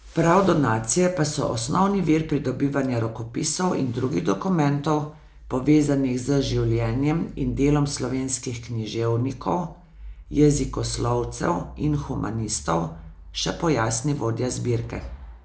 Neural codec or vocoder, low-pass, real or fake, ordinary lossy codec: none; none; real; none